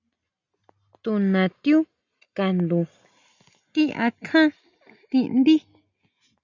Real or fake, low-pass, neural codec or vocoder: real; 7.2 kHz; none